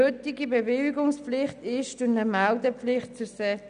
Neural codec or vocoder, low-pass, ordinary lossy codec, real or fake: none; 9.9 kHz; none; real